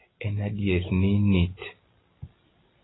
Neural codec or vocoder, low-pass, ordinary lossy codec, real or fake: none; 7.2 kHz; AAC, 16 kbps; real